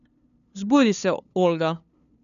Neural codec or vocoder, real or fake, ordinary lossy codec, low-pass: codec, 16 kHz, 2 kbps, FunCodec, trained on LibriTTS, 25 frames a second; fake; none; 7.2 kHz